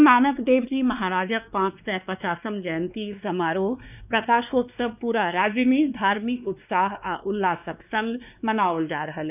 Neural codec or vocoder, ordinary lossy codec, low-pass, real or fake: codec, 16 kHz, 2 kbps, X-Codec, WavLM features, trained on Multilingual LibriSpeech; none; 3.6 kHz; fake